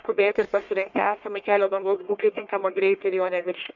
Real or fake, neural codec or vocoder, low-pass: fake; codec, 44.1 kHz, 1.7 kbps, Pupu-Codec; 7.2 kHz